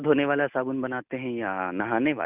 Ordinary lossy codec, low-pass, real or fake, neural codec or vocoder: none; 3.6 kHz; real; none